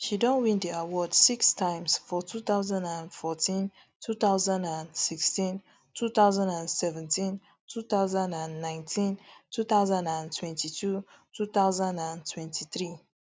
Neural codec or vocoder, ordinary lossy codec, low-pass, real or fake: none; none; none; real